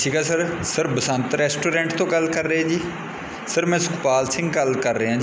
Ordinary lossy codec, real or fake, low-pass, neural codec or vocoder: none; real; none; none